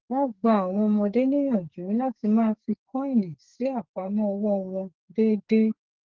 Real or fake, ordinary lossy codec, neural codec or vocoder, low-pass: fake; Opus, 16 kbps; codec, 44.1 kHz, 2.6 kbps, SNAC; 7.2 kHz